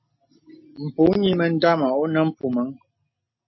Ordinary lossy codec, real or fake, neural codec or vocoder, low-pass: MP3, 24 kbps; real; none; 7.2 kHz